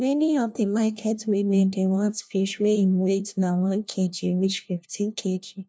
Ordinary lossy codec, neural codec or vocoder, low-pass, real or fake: none; codec, 16 kHz, 1 kbps, FunCodec, trained on LibriTTS, 50 frames a second; none; fake